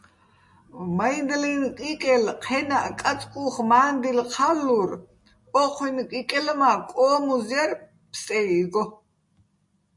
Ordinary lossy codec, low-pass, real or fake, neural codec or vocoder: MP3, 48 kbps; 10.8 kHz; real; none